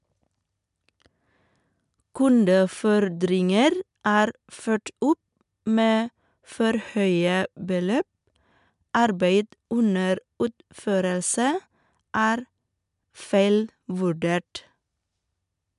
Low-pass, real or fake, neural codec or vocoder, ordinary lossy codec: 10.8 kHz; real; none; MP3, 96 kbps